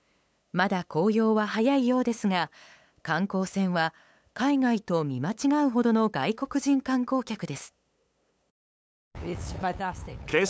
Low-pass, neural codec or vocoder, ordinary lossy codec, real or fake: none; codec, 16 kHz, 8 kbps, FunCodec, trained on LibriTTS, 25 frames a second; none; fake